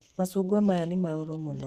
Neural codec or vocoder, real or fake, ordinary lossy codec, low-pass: codec, 44.1 kHz, 2.6 kbps, SNAC; fake; Opus, 64 kbps; 14.4 kHz